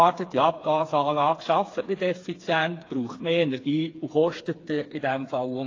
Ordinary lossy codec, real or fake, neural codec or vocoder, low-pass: AAC, 32 kbps; fake; codec, 16 kHz, 4 kbps, FreqCodec, smaller model; 7.2 kHz